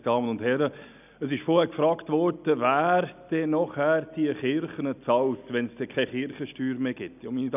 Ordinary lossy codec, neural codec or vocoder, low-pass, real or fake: none; none; 3.6 kHz; real